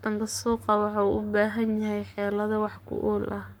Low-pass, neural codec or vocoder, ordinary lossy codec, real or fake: none; codec, 44.1 kHz, 7.8 kbps, Pupu-Codec; none; fake